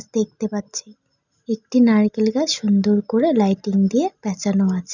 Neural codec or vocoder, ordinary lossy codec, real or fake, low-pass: none; none; real; 7.2 kHz